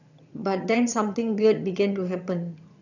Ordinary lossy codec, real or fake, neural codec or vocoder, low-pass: none; fake; vocoder, 22.05 kHz, 80 mel bands, HiFi-GAN; 7.2 kHz